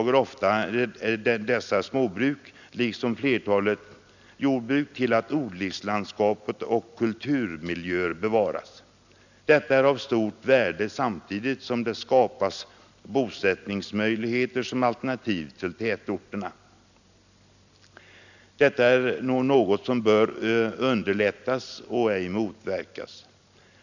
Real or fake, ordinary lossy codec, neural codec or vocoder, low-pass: real; none; none; 7.2 kHz